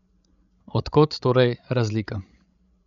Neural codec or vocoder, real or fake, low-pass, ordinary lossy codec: codec, 16 kHz, 16 kbps, FreqCodec, larger model; fake; 7.2 kHz; none